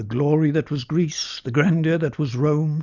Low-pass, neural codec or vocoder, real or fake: 7.2 kHz; none; real